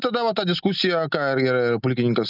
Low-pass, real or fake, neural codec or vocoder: 5.4 kHz; real; none